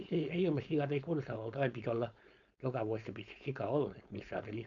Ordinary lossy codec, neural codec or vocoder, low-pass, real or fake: none; codec, 16 kHz, 4.8 kbps, FACodec; 7.2 kHz; fake